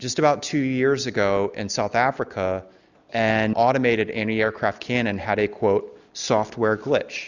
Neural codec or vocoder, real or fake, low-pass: none; real; 7.2 kHz